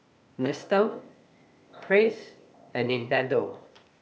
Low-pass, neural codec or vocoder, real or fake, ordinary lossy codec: none; codec, 16 kHz, 0.8 kbps, ZipCodec; fake; none